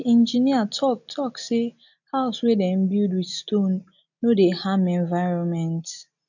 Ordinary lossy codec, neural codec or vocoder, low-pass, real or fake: none; none; 7.2 kHz; real